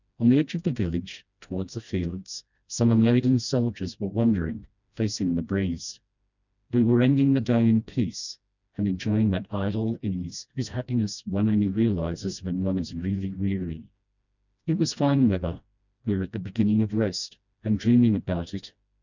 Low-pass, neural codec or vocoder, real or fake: 7.2 kHz; codec, 16 kHz, 1 kbps, FreqCodec, smaller model; fake